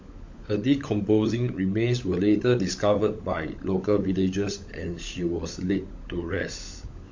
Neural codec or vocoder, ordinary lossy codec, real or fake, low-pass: codec, 16 kHz, 16 kbps, FunCodec, trained on LibriTTS, 50 frames a second; MP3, 48 kbps; fake; 7.2 kHz